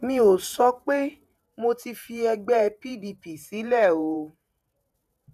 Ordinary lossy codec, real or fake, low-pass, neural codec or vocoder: none; fake; 14.4 kHz; vocoder, 48 kHz, 128 mel bands, Vocos